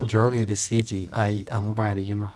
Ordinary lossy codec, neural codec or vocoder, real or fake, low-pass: none; codec, 24 kHz, 0.9 kbps, WavTokenizer, medium music audio release; fake; none